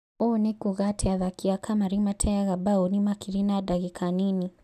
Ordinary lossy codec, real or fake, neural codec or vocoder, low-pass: none; real; none; 14.4 kHz